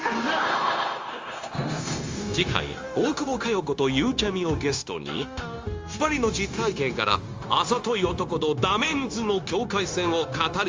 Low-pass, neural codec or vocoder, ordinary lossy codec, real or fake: 7.2 kHz; codec, 16 kHz, 0.9 kbps, LongCat-Audio-Codec; Opus, 32 kbps; fake